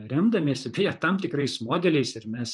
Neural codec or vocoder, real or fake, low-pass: vocoder, 44.1 kHz, 128 mel bands, Pupu-Vocoder; fake; 10.8 kHz